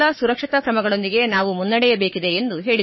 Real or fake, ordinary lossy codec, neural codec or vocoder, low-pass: fake; MP3, 24 kbps; codec, 44.1 kHz, 7.8 kbps, Pupu-Codec; 7.2 kHz